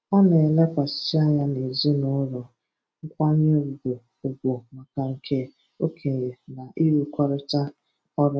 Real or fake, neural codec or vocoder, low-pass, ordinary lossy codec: real; none; none; none